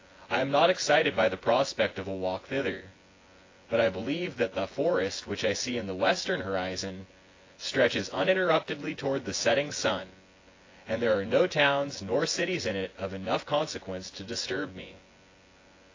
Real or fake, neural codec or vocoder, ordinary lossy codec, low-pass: fake; vocoder, 24 kHz, 100 mel bands, Vocos; AAC, 32 kbps; 7.2 kHz